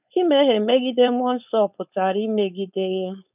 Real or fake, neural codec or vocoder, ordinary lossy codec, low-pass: fake; codec, 16 kHz, 4.8 kbps, FACodec; none; 3.6 kHz